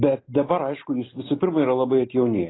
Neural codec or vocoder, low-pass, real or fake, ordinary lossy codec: none; 7.2 kHz; real; AAC, 16 kbps